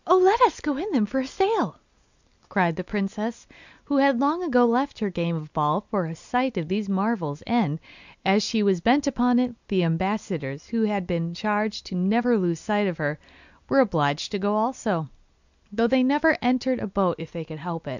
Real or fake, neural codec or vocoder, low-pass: real; none; 7.2 kHz